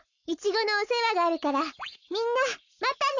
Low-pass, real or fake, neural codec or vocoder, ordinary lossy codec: 7.2 kHz; fake; codec, 44.1 kHz, 7.8 kbps, Pupu-Codec; none